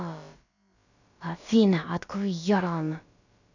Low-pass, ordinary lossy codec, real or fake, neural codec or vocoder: 7.2 kHz; none; fake; codec, 16 kHz, about 1 kbps, DyCAST, with the encoder's durations